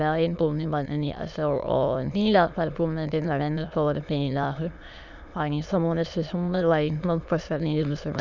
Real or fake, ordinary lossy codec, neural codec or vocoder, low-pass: fake; none; autoencoder, 22.05 kHz, a latent of 192 numbers a frame, VITS, trained on many speakers; 7.2 kHz